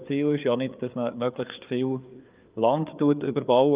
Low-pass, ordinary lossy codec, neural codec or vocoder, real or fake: 3.6 kHz; Opus, 24 kbps; codec, 16 kHz, 4 kbps, FreqCodec, larger model; fake